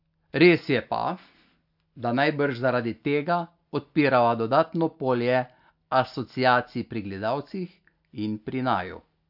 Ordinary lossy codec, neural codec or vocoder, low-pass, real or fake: AAC, 48 kbps; none; 5.4 kHz; real